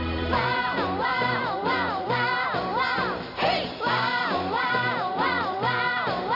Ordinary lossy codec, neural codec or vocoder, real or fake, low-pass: none; vocoder, 44.1 kHz, 128 mel bands every 256 samples, BigVGAN v2; fake; 5.4 kHz